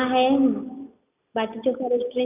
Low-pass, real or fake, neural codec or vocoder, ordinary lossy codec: 3.6 kHz; real; none; none